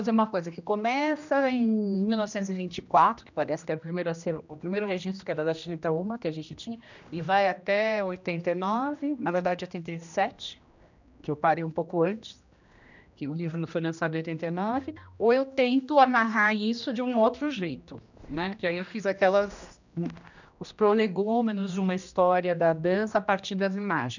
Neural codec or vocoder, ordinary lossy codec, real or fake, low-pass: codec, 16 kHz, 1 kbps, X-Codec, HuBERT features, trained on general audio; none; fake; 7.2 kHz